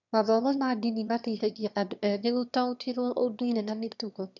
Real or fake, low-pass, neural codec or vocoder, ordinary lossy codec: fake; 7.2 kHz; autoencoder, 22.05 kHz, a latent of 192 numbers a frame, VITS, trained on one speaker; none